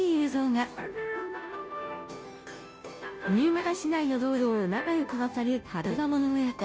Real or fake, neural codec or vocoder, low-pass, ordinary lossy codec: fake; codec, 16 kHz, 0.5 kbps, FunCodec, trained on Chinese and English, 25 frames a second; none; none